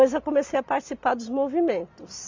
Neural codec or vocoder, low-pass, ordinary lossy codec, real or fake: none; 7.2 kHz; MP3, 64 kbps; real